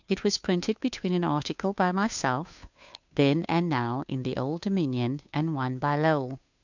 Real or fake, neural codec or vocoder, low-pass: fake; codec, 16 kHz, 2 kbps, FunCodec, trained on Chinese and English, 25 frames a second; 7.2 kHz